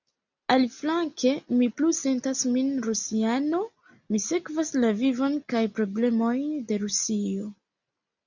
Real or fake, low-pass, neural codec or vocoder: real; 7.2 kHz; none